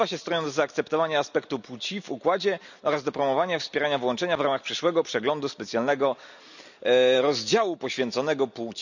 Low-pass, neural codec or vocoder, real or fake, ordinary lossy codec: 7.2 kHz; none; real; none